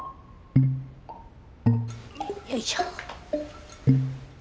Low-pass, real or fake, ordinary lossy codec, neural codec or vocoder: none; real; none; none